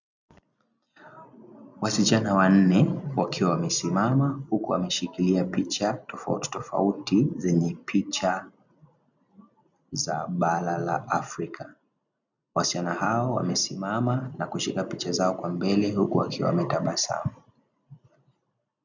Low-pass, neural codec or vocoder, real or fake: 7.2 kHz; none; real